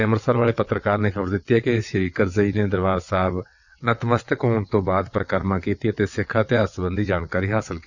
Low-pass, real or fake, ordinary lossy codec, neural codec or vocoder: 7.2 kHz; fake; none; vocoder, 22.05 kHz, 80 mel bands, WaveNeXt